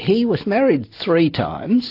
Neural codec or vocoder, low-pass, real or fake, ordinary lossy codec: none; 5.4 kHz; real; AAC, 32 kbps